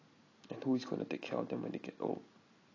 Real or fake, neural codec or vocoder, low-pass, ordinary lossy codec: real; none; 7.2 kHz; AAC, 32 kbps